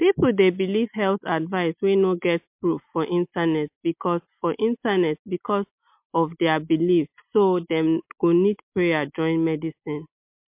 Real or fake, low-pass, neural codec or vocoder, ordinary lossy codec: real; 3.6 kHz; none; MP3, 32 kbps